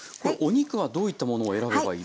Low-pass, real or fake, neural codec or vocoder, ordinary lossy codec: none; real; none; none